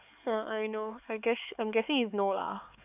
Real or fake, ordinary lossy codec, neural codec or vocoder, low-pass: fake; none; codec, 16 kHz, 4 kbps, X-Codec, HuBERT features, trained on LibriSpeech; 3.6 kHz